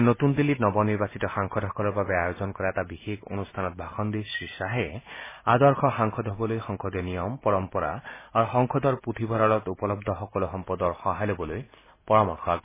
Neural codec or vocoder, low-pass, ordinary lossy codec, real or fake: none; 3.6 kHz; MP3, 16 kbps; real